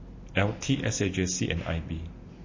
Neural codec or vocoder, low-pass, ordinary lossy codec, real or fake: none; 7.2 kHz; MP3, 32 kbps; real